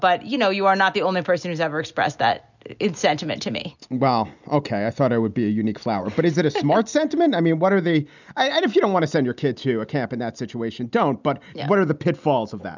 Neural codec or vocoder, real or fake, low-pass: none; real; 7.2 kHz